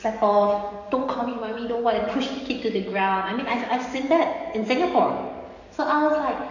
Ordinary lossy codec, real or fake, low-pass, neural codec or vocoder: none; fake; 7.2 kHz; codec, 44.1 kHz, 7.8 kbps, DAC